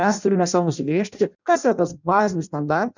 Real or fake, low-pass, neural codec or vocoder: fake; 7.2 kHz; codec, 16 kHz in and 24 kHz out, 0.6 kbps, FireRedTTS-2 codec